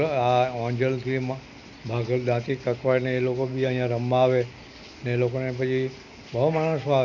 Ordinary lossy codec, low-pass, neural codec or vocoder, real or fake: none; 7.2 kHz; none; real